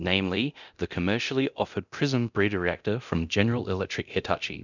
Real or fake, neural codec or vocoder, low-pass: fake; codec, 24 kHz, 0.9 kbps, DualCodec; 7.2 kHz